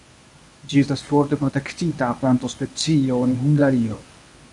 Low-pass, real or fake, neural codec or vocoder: 10.8 kHz; fake; codec, 24 kHz, 0.9 kbps, WavTokenizer, medium speech release version 1